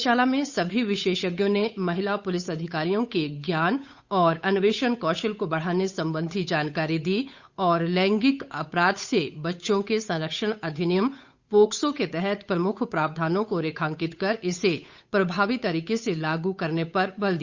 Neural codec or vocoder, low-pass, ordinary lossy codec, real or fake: codec, 16 kHz, 8 kbps, FunCodec, trained on Chinese and English, 25 frames a second; 7.2 kHz; Opus, 64 kbps; fake